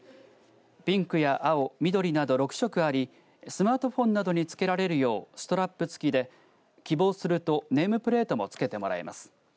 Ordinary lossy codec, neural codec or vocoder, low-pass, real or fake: none; none; none; real